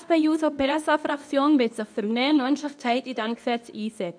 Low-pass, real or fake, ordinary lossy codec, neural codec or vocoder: 9.9 kHz; fake; none; codec, 24 kHz, 0.9 kbps, WavTokenizer, medium speech release version 2